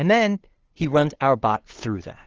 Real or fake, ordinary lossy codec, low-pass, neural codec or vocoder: fake; Opus, 16 kbps; 7.2 kHz; codec, 16 kHz, 2 kbps, FunCodec, trained on LibriTTS, 25 frames a second